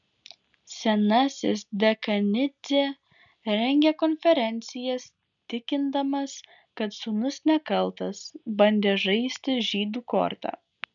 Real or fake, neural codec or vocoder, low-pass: real; none; 7.2 kHz